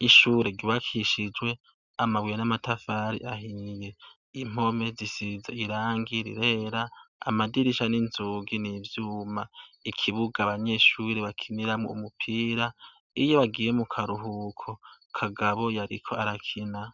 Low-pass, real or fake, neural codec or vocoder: 7.2 kHz; real; none